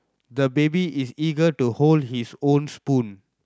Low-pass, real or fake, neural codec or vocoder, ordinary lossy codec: none; real; none; none